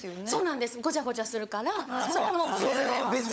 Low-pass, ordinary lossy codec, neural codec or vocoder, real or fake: none; none; codec, 16 kHz, 16 kbps, FunCodec, trained on LibriTTS, 50 frames a second; fake